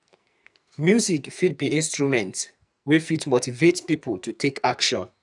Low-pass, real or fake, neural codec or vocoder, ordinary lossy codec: 10.8 kHz; fake; codec, 44.1 kHz, 2.6 kbps, SNAC; none